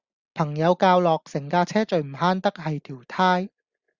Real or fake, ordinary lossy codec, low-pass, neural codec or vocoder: real; Opus, 64 kbps; 7.2 kHz; none